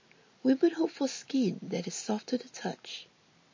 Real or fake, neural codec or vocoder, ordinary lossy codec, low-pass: real; none; MP3, 32 kbps; 7.2 kHz